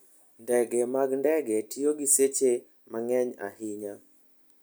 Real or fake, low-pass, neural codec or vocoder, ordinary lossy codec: real; none; none; none